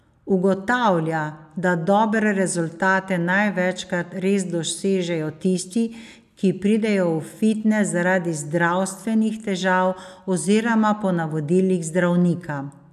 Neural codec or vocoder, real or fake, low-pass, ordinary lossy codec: none; real; 14.4 kHz; none